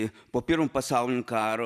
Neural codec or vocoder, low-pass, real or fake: none; 14.4 kHz; real